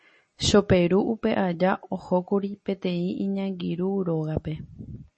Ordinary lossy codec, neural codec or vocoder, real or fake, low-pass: MP3, 32 kbps; none; real; 10.8 kHz